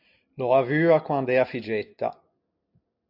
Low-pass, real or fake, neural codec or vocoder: 5.4 kHz; real; none